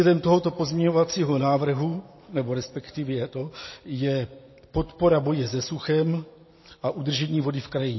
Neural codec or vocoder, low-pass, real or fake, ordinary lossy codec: none; 7.2 kHz; real; MP3, 24 kbps